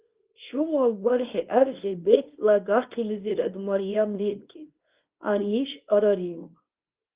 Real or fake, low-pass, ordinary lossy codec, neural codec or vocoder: fake; 3.6 kHz; Opus, 32 kbps; codec, 24 kHz, 0.9 kbps, WavTokenizer, small release